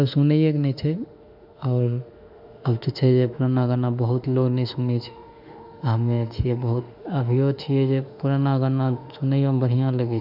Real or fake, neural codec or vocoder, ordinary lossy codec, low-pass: fake; autoencoder, 48 kHz, 32 numbers a frame, DAC-VAE, trained on Japanese speech; none; 5.4 kHz